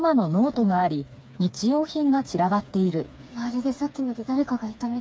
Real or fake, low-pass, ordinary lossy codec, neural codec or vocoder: fake; none; none; codec, 16 kHz, 4 kbps, FreqCodec, smaller model